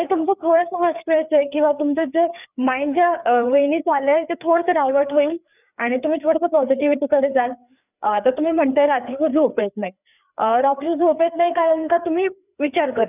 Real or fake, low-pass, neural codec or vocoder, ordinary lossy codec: fake; 3.6 kHz; codec, 16 kHz, 4 kbps, FreqCodec, larger model; none